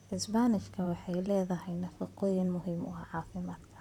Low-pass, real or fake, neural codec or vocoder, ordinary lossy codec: 19.8 kHz; fake; vocoder, 44.1 kHz, 128 mel bands, Pupu-Vocoder; none